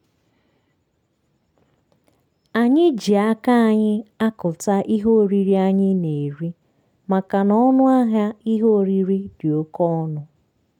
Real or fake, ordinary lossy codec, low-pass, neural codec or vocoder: real; none; 19.8 kHz; none